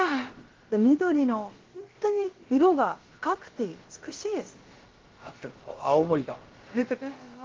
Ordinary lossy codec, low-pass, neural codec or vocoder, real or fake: Opus, 16 kbps; 7.2 kHz; codec, 16 kHz, about 1 kbps, DyCAST, with the encoder's durations; fake